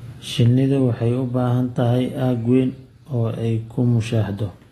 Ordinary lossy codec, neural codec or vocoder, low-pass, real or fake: AAC, 32 kbps; none; 19.8 kHz; real